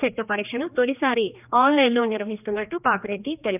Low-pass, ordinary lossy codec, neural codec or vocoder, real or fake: 3.6 kHz; none; codec, 16 kHz, 2 kbps, X-Codec, HuBERT features, trained on general audio; fake